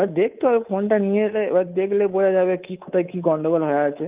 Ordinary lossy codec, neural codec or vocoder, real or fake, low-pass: Opus, 32 kbps; codec, 24 kHz, 3.1 kbps, DualCodec; fake; 3.6 kHz